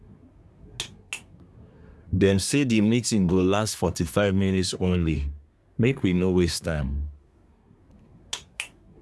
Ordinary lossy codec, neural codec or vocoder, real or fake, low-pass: none; codec, 24 kHz, 1 kbps, SNAC; fake; none